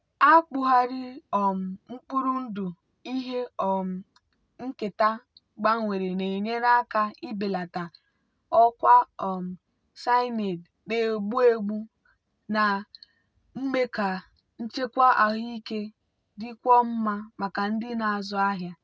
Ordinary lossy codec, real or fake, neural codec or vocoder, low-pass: none; real; none; none